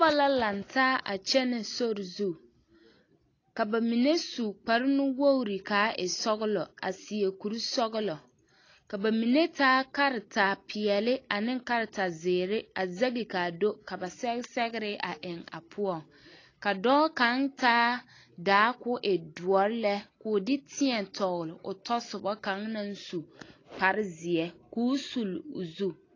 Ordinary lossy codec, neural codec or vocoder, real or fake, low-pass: AAC, 32 kbps; none; real; 7.2 kHz